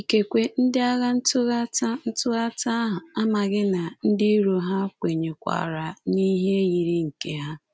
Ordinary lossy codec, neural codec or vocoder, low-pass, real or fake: none; none; none; real